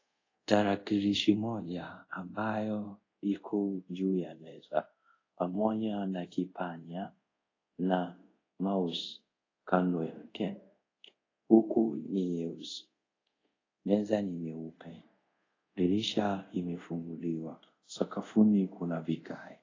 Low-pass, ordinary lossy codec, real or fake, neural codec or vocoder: 7.2 kHz; AAC, 32 kbps; fake; codec, 24 kHz, 0.5 kbps, DualCodec